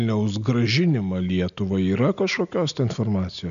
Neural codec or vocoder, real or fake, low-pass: none; real; 7.2 kHz